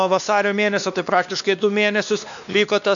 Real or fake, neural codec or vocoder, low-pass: fake; codec, 16 kHz, 2 kbps, X-Codec, WavLM features, trained on Multilingual LibriSpeech; 7.2 kHz